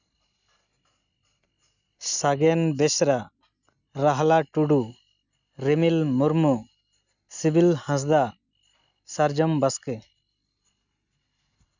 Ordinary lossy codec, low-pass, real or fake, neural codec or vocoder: none; 7.2 kHz; real; none